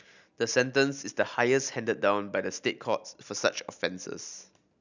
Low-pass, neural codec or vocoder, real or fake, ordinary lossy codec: 7.2 kHz; none; real; none